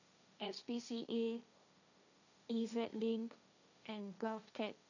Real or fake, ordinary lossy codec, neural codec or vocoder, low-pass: fake; none; codec, 16 kHz, 1.1 kbps, Voila-Tokenizer; 7.2 kHz